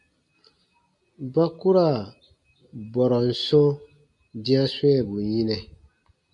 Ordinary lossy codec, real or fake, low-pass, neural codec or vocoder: MP3, 64 kbps; real; 10.8 kHz; none